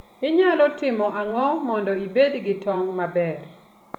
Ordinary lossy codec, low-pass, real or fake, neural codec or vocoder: none; 19.8 kHz; fake; vocoder, 44.1 kHz, 128 mel bands every 512 samples, BigVGAN v2